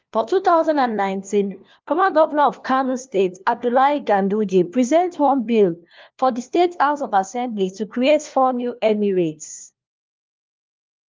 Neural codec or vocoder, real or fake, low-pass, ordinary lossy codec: codec, 16 kHz, 1 kbps, FunCodec, trained on LibriTTS, 50 frames a second; fake; 7.2 kHz; Opus, 24 kbps